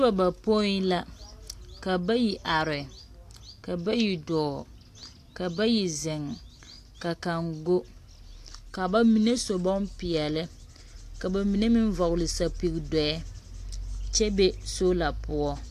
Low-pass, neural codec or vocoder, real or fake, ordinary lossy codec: 14.4 kHz; none; real; AAC, 64 kbps